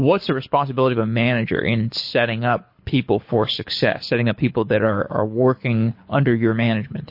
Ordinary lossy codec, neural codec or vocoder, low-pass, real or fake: MP3, 32 kbps; codec, 24 kHz, 6 kbps, HILCodec; 5.4 kHz; fake